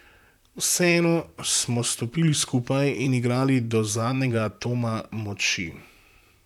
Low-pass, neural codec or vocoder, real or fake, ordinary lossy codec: 19.8 kHz; vocoder, 44.1 kHz, 128 mel bands, Pupu-Vocoder; fake; none